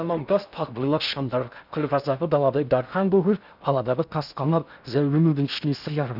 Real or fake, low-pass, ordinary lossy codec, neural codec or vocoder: fake; 5.4 kHz; none; codec, 16 kHz in and 24 kHz out, 0.6 kbps, FocalCodec, streaming, 2048 codes